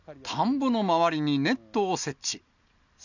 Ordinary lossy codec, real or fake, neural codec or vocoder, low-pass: none; real; none; 7.2 kHz